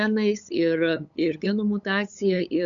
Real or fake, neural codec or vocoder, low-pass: fake; codec, 16 kHz, 8 kbps, FunCodec, trained on LibriTTS, 25 frames a second; 7.2 kHz